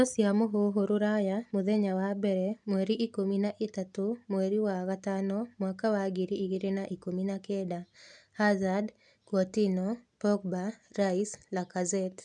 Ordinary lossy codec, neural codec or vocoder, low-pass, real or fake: none; none; 10.8 kHz; real